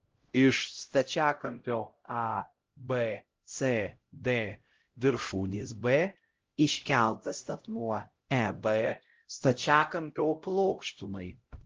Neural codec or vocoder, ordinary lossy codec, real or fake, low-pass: codec, 16 kHz, 0.5 kbps, X-Codec, HuBERT features, trained on LibriSpeech; Opus, 16 kbps; fake; 7.2 kHz